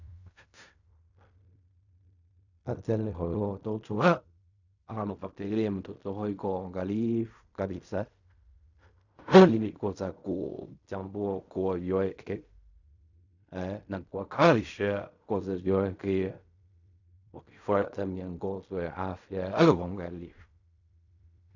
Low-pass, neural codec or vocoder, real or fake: 7.2 kHz; codec, 16 kHz in and 24 kHz out, 0.4 kbps, LongCat-Audio-Codec, fine tuned four codebook decoder; fake